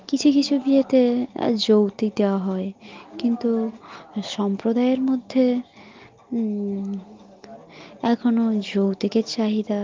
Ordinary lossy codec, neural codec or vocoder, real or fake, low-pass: Opus, 32 kbps; none; real; 7.2 kHz